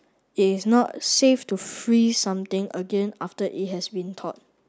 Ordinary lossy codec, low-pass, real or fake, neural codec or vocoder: none; none; real; none